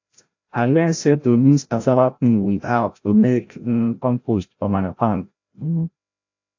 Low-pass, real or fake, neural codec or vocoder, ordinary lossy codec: 7.2 kHz; fake; codec, 16 kHz, 0.5 kbps, FreqCodec, larger model; AAC, 48 kbps